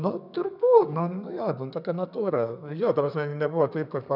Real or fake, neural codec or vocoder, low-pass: fake; codec, 32 kHz, 1.9 kbps, SNAC; 5.4 kHz